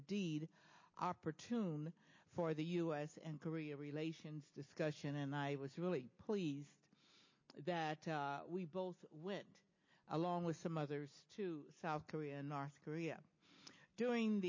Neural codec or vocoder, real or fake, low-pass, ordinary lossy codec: none; real; 7.2 kHz; MP3, 32 kbps